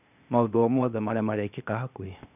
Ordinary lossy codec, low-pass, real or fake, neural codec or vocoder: none; 3.6 kHz; fake; codec, 16 kHz, 0.8 kbps, ZipCodec